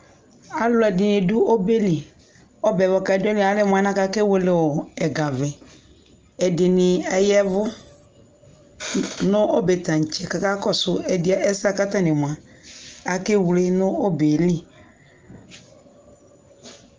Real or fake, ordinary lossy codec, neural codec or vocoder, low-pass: real; Opus, 24 kbps; none; 7.2 kHz